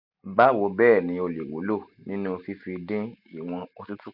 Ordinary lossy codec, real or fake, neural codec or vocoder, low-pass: none; real; none; 5.4 kHz